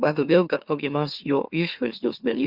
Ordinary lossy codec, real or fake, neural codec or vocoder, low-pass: Opus, 64 kbps; fake; autoencoder, 44.1 kHz, a latent of 192 numbers a frame, MeloTTS; 5.4 kHz